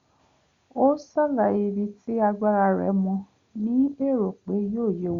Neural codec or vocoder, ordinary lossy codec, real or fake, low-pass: none; Opus, 64 kbps; real; 7.2 kHz